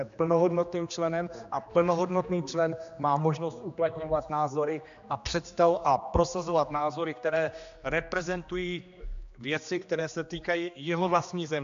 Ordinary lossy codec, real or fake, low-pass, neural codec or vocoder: AAC, 64 kbps; fake; 7.2 kHz; codec, 16 kHz, 2 kbps, X-Codec, HuBERT features, trained on general audio